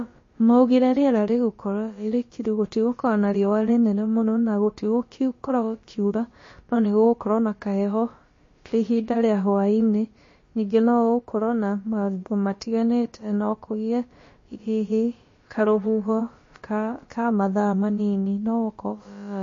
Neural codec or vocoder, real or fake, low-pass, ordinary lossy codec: codec, 16 kHz, about 1 kbps, DyCAST, with the encoder's durations; fake; 7.2 kHz; MP3, 32 kbps